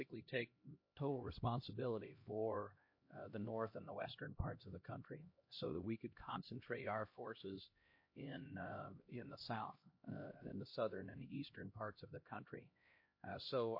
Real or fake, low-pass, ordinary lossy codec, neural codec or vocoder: fake; 5.4 kHz; MP3, 32 kbps; codec, 16 kHz, 1 kbps, X-Codec, HuBERT features, trained on LibriSpeech